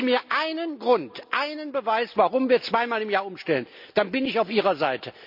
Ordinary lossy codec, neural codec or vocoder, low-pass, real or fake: MP3, 48 kbps; none; 5.4 kHz; real